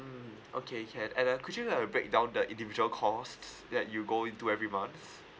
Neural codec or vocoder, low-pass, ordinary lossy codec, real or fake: none; none; none; real